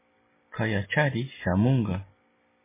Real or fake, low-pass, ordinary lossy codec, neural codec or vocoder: real; 3.6 kHz; MP3, 16 kbps; none